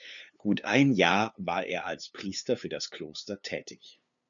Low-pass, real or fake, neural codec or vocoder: 7.2 kHz; fake; codec, 16 kHz, 2 kbps, FunCodec, trained on LibriTTS, 25 frames a second